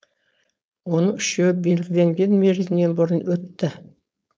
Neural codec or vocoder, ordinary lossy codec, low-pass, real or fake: codec, 16 kHz, 4.8 kbps, FACodec; none; none; fake